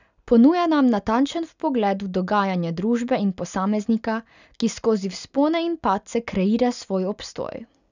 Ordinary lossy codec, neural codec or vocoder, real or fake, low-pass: none; none; real; 7.2 kHz